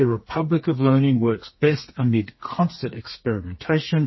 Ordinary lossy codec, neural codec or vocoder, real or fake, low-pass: MP3, 24 kbps; codec, 32 kHz, 1.9 kbps, SNAC; fake; 7.2 kHz